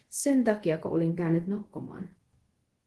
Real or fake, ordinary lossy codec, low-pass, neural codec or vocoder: fake; Opus, 16 kbps; 10.8 kHz; codec, 24 kHz, 0.5 kbps, DualCodec